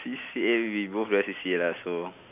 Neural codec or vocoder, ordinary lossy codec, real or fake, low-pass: none; none; real; 3.6 kHz